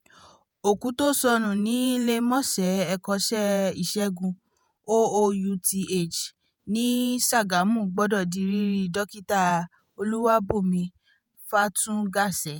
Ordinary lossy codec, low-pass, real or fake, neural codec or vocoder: none; none; fake; vocoder, 48 kHz, 128 mel bands, Vocos